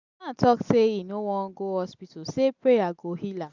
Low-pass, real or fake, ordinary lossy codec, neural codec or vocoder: 7.2 kHz; real; none; none